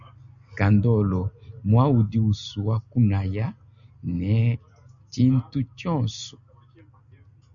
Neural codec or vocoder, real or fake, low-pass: none; real; 7.2 kHz